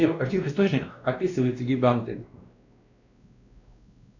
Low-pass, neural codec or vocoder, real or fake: 7.2 kHz; codec, 16 kHz, 1 kbps, X-Codec, WavLM features, trained on Multilingual LibriSpeech; fake